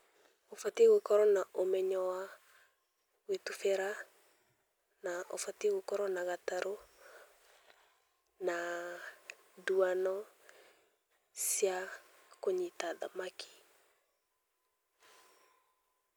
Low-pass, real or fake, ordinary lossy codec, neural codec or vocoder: none; real; none; none